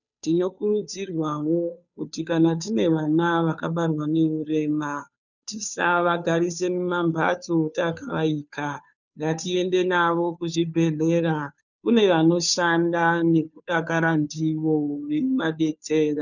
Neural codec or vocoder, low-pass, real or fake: codec, 16 kHz, 2 kbps, FunCodec, trained on Chinese and English, 25 frames a second; 7.2 kHz; fake